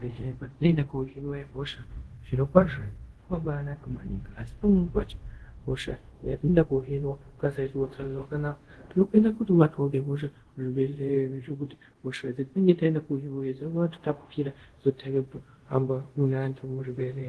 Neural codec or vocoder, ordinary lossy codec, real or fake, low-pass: codec, 24 kHz, 0.5 kbps, DualCodec; Opus, 16 kbps; fake; 10.8 kHz